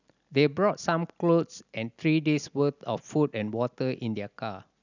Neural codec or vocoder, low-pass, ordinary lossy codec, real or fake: none; 7.2 kHz; none; real